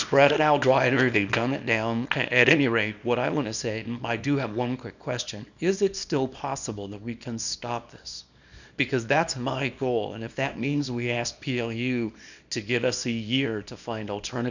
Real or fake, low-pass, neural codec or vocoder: fake; 7.2 kHz; codec, 24 kHz, 0.9 kbps, WavTokenizer, small release